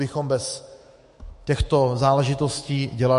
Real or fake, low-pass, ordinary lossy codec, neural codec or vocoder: fake; 14.4 kHz; MP3, 48 kbps; autoencoder, 48 kHz, 128 numbers a frame, DAC-VAE, trained on Japanese speech